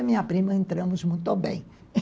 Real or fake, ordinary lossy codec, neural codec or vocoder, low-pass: real; none; none; none